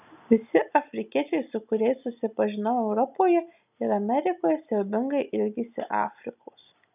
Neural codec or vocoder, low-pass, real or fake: none; 3.6 kHz; real